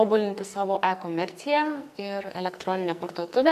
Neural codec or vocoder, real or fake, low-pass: codec, 44.1 kHz, 2.6 kbps, SNAC; fake; 14.4 kHz